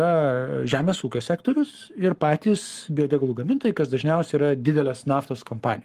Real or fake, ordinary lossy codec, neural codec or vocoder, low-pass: fake; Opus, 24 kbps; codec, 44.1 kHz, 7.8 kbps, Pupu-Codec; 14.4 kHz